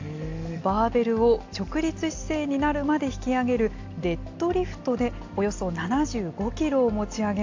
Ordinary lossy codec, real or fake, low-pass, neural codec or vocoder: AAC, 48 kbps; real; 7.2 kHz; none